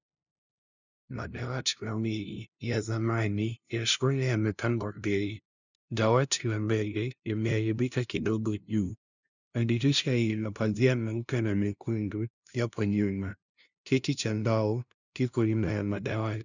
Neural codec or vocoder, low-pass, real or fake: codec, 16 kHz, 0.5 kbps, FunCodec, trained on LibriTTS, 25 frames a second; 7.2 kHz; fake